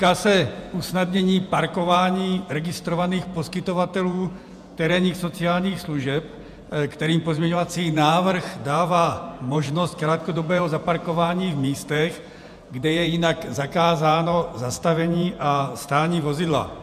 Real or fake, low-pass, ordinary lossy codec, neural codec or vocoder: fake; 14.4 kHz; MP3, 96 kbps; vocoder, 48 kHz, 128 mel bands, Vocos